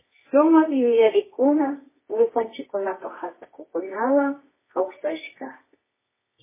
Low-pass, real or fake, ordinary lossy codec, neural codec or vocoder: 3.6 kHz; fake; MP3, 16 kbps; codec, 24 kHz, 0.9 kbps, WavTokenizer, medium music audio release